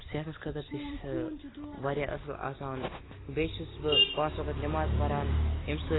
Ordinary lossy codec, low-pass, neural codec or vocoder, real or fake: AAC, 16 kbps; 7.2 kHz; none; real